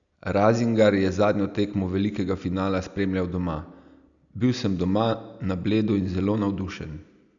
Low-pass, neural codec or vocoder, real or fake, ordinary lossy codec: 7.2 kHz; none; real; none